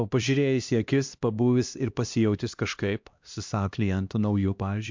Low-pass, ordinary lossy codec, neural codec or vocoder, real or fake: 7.2 kHz; MP3, 64 kbps; codec, 16 kHz, 1 kbps, X-Codec, HuBERT features, trained on LibriSpeech; fake